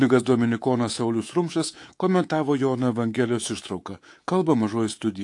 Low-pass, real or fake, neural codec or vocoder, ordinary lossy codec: 10.8 kHz; fake; codec, 24 kHz, 3.1 kbps, DualCodec; AAC, 48 kbps